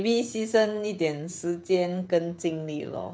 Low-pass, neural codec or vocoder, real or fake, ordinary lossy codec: none; codec, 16 kHz, 16 kbps, FreqCodec, smaller model; fake; none